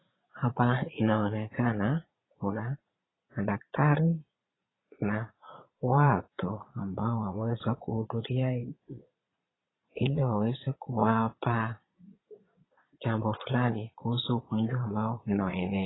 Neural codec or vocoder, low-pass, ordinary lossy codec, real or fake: vocoder, 22.05 kHz, 80 mel bands, Vocos; 7.2 kHz; AAC, 16 kbps; fake